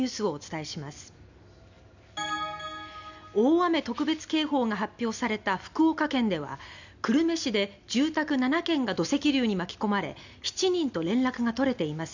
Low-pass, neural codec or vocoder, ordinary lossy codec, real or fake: 7.2 kHz; none; none; real